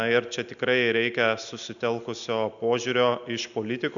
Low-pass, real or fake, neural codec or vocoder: 7.2 kHz; real; none